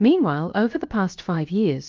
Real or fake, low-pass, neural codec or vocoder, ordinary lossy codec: fake; 7.2 kHz; codec, 16 kHz, about 1 kbps, DyCAST, with the encoder's durations; Opus, 24 kbps